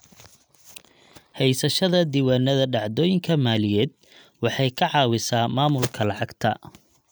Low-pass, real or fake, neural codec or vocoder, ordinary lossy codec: none; real; none; none